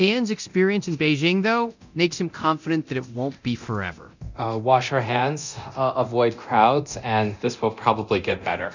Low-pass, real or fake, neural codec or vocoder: 7.2 kHz; fake; codec, 24 kHz, 0.9 kbps, DualCodec